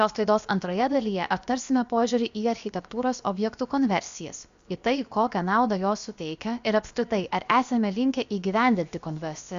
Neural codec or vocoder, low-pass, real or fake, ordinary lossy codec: codec, 16 kHz, about 1 kbps, DyCAST, with the encoder's durations; 7.2 kHz; fake; Opus, 64 kbps